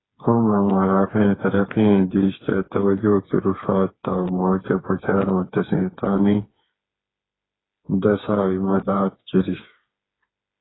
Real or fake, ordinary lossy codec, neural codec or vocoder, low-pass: fake; AAC, 16 kbps; codec, 16 kHz, 4 kbps, FreqCodec, smaller model; 7.2 kHz